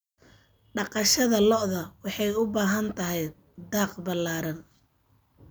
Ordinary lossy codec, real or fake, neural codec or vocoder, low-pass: none; real; none; none